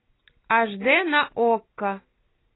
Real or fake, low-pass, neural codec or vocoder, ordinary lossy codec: real; 7.2 kHz; none; AAC, 16 kbps